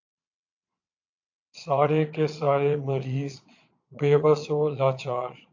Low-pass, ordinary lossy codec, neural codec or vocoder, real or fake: 7.2 kHz; AAC, 48 kbps; vocoder, 22.05 kHz, 80 mel bands, Vocos; fake